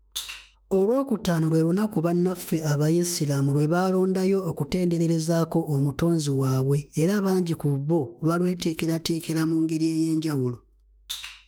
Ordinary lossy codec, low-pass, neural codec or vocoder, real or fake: none; none; autoencoder, 48 kHz, 32 numbers a frame, DAC-VAE, trained on Japanese speech; fake